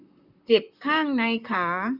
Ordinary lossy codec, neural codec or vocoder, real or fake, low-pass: none; vocoder, 44.1 kHz, 128 mel bands, Pupu-Vocoder; fake; 5.4 kHz